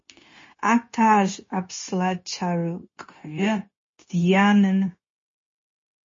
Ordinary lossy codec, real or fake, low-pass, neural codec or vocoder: MP3, 32 kbps; fake; 7.2 kHz; codec, 16 kHz, 0.9 kbps, LongCat-Audio-Codec